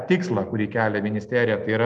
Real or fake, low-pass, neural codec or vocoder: real; 10.8 kHz; none